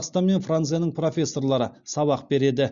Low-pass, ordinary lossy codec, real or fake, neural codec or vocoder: 7.2 kHz; Opus, 64 kbps; real; none